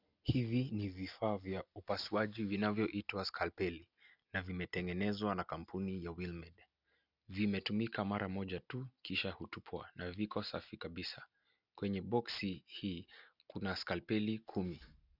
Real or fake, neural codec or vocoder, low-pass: real; none; 5.4 kHz